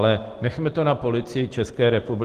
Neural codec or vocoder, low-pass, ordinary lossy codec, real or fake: none; 14.4 kHz; Opus, 16 kbps; real